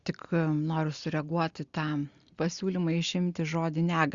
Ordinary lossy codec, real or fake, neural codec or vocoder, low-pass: Opus, 64 kbps; real; none; 7.2 kHz